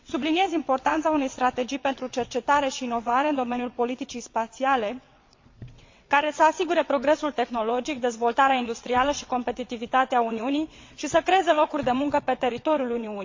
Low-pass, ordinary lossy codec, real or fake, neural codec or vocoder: 7.2 kHz; MP3, 48 kbps; fake; vocoder, 22.05 kHz, 80 mel bands, WaveNeXt